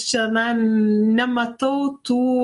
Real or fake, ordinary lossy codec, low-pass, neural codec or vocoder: real; MP3, 48 kbps; 14.4 kHz; none